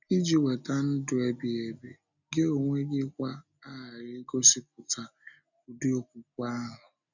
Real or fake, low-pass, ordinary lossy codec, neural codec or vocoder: real; 7.2 kHz; none; none